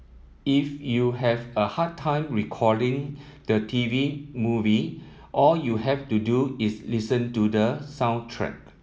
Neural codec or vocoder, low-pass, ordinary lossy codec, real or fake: none; none; none; real